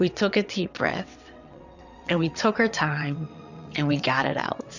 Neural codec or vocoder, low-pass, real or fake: vocoder, 22.05 kHz, 80 mel bands, WaveNeXt; 7.2 kHz; fake